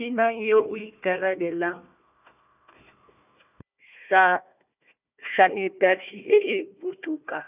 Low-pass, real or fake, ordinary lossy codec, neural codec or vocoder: 3.6 kHz; fake; none; codec, 16 kHz, 1 kbps, FunCodec, trained on Chinese and English, 50 frames a second